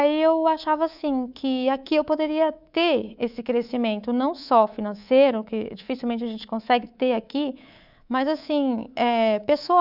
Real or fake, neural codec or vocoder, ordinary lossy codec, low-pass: fake; codec, 24 kHz, 3.1 kbps, DualCodec; none; 5.4 kHz